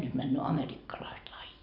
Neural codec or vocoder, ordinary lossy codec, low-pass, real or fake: none; none; 5.4 kHz; real